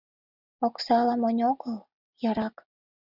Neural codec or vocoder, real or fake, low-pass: none; real; 5.4 kHz